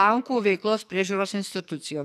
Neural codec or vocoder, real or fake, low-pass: codec, 44.1 kHz, 2.6 kbps, SNAC; fake; 14.4 kHz